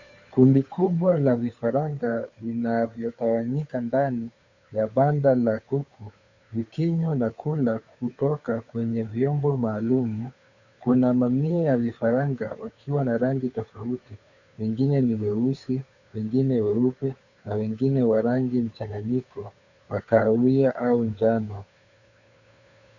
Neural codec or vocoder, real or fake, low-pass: codec, 16 kHz, 2 kbps, FunCodec, trained on Chinese and English, 25 frames a second; fake; 7.2 kHz